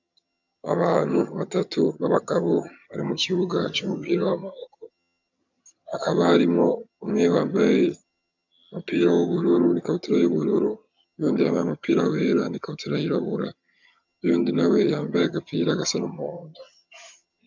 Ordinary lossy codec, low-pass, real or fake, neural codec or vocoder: MP3, 64 kbps; 7.2 kHz; fake; vocoder, 22.05 kHz, 80 mel bands, HiFi-GAN